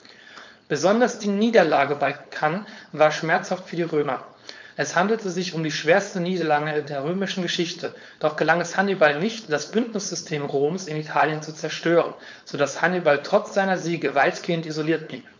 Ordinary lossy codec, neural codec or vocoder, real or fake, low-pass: MP3, 64 kbps; codec, 16 kHz, 4.8 kbps, FACodec; fake; 7.2 kHz